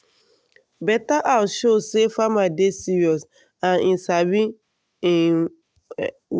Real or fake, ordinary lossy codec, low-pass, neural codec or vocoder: real; none; none; none